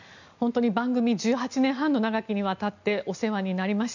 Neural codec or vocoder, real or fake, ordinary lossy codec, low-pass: none; real; none; 7.2 kHz